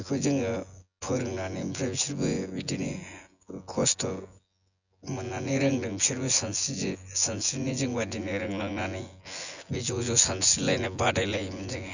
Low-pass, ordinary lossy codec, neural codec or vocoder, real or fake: 7.2 kHz; none; vocoder, 24 kHz, 100 mel bands, Vocos; fake